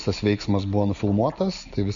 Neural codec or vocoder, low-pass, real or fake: none; 7.2 kHz; real